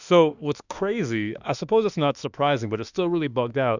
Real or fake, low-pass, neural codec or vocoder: fake; 7.2 kHz; autoencoder, 48 kHz, 32 numbers a frame, DAC-VAE, trained on Japanese speech